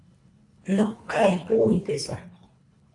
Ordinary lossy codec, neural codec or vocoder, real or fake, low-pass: AAC, 32 kbps; codec, 24 kHz, 1.5 kbps, HILCodec; fake; 10.8 kHz